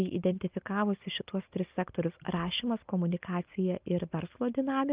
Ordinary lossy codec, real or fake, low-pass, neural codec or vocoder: Opus, 32 kbps; real; 3.6 kHz; none